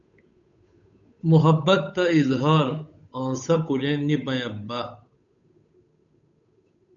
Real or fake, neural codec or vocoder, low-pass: fake; codec, 16 kHz, 8 kbps, FunCodec, trained on Chinese and English, 25 frames a second; 7.2 kHz